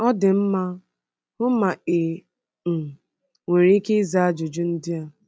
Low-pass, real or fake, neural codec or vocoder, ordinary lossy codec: none; real; none; none